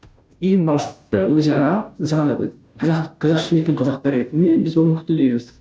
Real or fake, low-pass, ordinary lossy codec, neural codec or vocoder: fake; none; none; codec, 16 kHz, 0.5 kbps, FunCodec, trained on Chinese and English, 25 frames a second